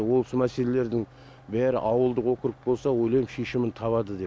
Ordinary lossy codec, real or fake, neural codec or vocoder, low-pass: none; real; none; none